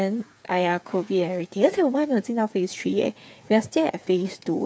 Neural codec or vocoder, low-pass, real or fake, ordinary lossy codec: codec, 16 kHz, 8 kbps, FreqCodec, smaller model; none; fake; none